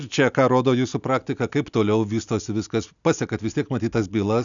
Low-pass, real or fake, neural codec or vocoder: 7.2 kHz; real; none